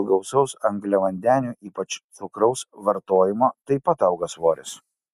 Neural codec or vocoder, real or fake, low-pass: none; real; 14.4 kHz